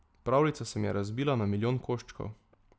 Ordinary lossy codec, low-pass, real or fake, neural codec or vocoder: none; none; real; none